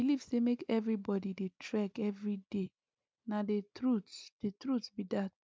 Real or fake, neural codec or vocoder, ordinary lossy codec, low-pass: real; none; none; none